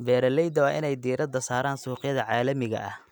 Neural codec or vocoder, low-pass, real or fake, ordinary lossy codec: none; 19.8 kHz; real; none